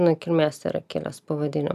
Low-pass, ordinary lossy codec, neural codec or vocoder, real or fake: 14.4 kHz; AAC, 96 kbps; none; real